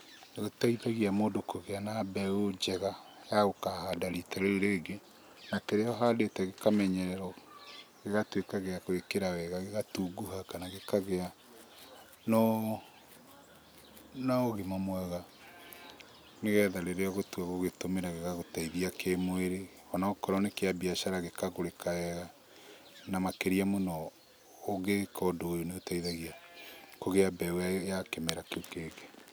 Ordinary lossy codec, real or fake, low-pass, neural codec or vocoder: none; real; none; none